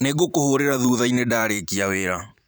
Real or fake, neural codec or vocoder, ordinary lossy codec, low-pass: fake; vocoder, 44.1 kHz, 128 mel bands every 512 samples, BigVGAN v2; none; none